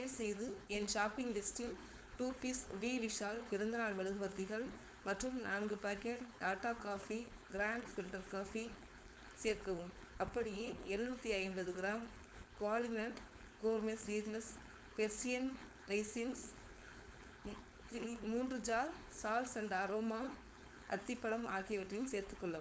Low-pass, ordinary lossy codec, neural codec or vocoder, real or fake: none; none; codec, 16 kHz, 4.8 kbps, FACodec; fake